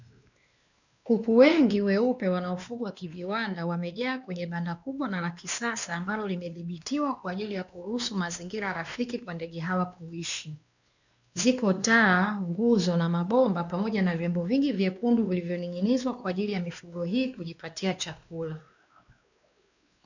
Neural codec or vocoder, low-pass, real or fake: codec, 16 kHz, 2 kbps, X-Codec, WavLM features, trained on Multilingual LibriSpeech; 7.2 kHz; fake